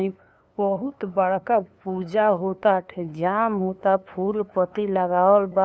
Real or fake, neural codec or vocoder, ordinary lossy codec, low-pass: fake; codec, 16 kHz, 2 kbps, FunCodec, trained on LibriTTS, 25 frames a second; none; none